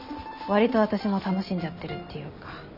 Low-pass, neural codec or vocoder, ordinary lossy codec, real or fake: 5.4 kHz; none; none; real